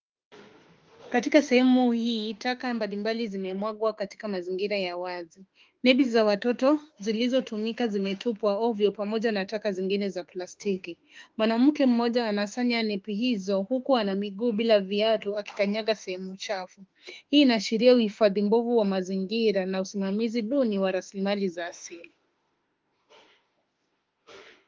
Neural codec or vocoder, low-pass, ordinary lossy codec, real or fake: autoencoder, 48 kHz, 32 numbers a frame, DAC-VAE, trained on Japanese speech; 7.2 kHz; Opus, 32 kbps; fake